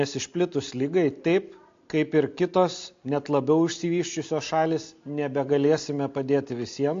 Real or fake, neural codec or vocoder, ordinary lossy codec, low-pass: real; none; AAC, 48 kbps; 7.2 kHz